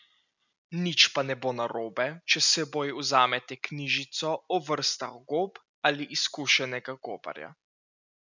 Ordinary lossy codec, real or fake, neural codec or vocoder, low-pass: none; real; none; 7.2 kHz